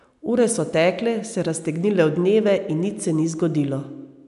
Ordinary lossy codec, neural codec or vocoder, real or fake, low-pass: MP3, 96 kbps; none; real; 10.8 kHz